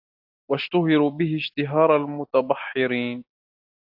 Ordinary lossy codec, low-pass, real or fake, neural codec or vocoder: AAC, 48 kbps; 5.4 kHz; real; none